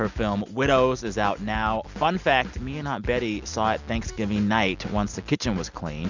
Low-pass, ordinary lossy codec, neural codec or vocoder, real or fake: 7.2 kHz; Opus, 64 kbps; none; real